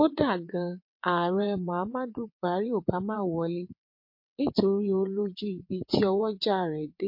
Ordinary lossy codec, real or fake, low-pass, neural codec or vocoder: MP3, 32 kbps; fake; 5.4 kHz; vocoder, 22.05 kHz, 80 mel bands, WaveNeXt